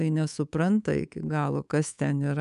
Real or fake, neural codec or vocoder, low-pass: fake; codec, 24 kHz, 3.1 kbps, DualCodec; 10.8 kHz